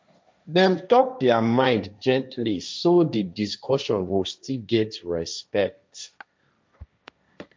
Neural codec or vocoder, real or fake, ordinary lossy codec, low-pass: codec, 16 kHz, 1.1 kbps, Voila-Tokenizer; fake; none; none